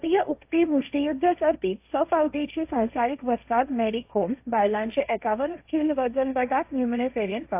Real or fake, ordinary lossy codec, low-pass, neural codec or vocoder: fake; AAC, 32 kbps; 3.6 kHz; codec, 16 kHz, 1.1 kbps, Voila-Tokenizer